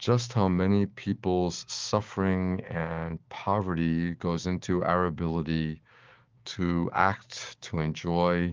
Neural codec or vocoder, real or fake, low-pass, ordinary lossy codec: codec, 16 kHz, 6 kbps, DAC; fake; 7.2 kHz; Opus, 32 kbps